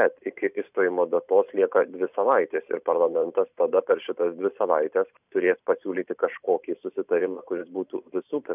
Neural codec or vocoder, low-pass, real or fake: vocoder, 44.1 kHz, 128 mel bands every 512 samples, BigVGAN v2; 3.6 kHz; fake